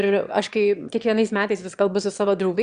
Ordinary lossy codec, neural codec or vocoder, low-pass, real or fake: Opus, 64 kbps; autoencoder, 22.05 kHz, a latent of 192 numbers a frame, VITS, trained on one speaker; 9.9 kHz; fake